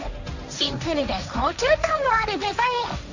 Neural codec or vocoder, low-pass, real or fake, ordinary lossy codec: codec, 16 kHz, 1.1 kbps, Voila-Tokenizer; 7.2 kHz; fake; none